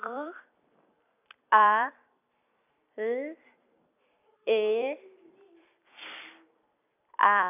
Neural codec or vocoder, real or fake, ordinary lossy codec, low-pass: vocoder, 44.1 kHz, 128 mel bands every 256 samples, BigVGAN v2; fake; none; 3.6 kHz